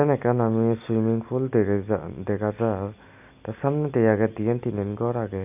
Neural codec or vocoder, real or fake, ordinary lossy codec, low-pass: none; real; AAC, 32 kbps; 3.6 kHz